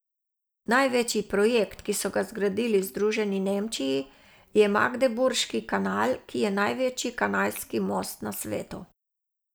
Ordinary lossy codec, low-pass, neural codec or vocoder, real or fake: none; none; none; real